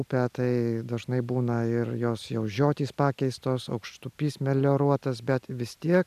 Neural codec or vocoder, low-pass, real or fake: none; 14.4 kHz; real